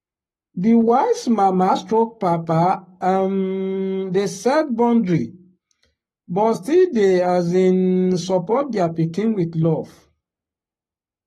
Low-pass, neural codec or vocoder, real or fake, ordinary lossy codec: 19.8 kHz; codec, 44.1 kHz, 7.8 kbps, Pupu-Codec; fake; AAC, 32 kbps